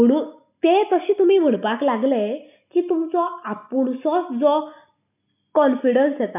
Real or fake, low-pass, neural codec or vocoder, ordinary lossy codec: real; 3.6 kHz; none; none